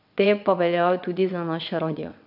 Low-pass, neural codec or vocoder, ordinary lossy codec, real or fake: 5.4 kHz; vocoder, 22.05 kHz, 80 mel bands, WaveNeXt; none; fake